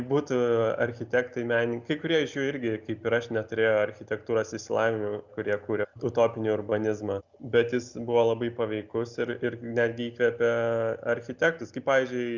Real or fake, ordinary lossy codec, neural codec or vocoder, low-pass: real; Opus, 64 kbps; none; 7.2 kHz